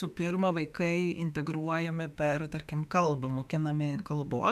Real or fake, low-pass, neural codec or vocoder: fake; 14.4 kHz; autoencoder, 48 kHz, 32 numbers a frame, DAC-VAE, trained on Japanese speech